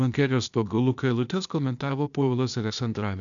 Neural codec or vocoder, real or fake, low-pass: codec, 16 kHz, 0.8 kbps, ZipCodec; fake; 7.2 kHz